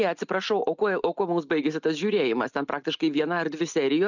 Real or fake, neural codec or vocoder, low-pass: real; none; 7.2 kHz